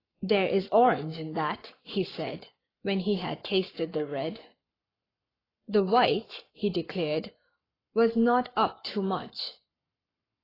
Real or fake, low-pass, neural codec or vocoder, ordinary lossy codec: fake; 5.4 kHz; codec, 44.1 kHz, 7.8 kbps, Pupu-Codec; AAC, 24 kbps